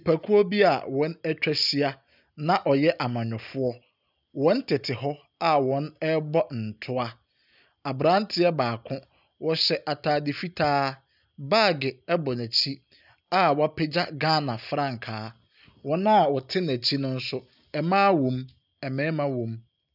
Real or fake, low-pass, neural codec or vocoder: real; 5.4 kHz; none